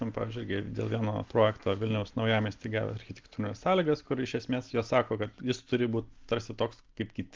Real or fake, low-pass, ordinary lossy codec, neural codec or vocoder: real; 7.2 kHz; Opus, 24 kbps; none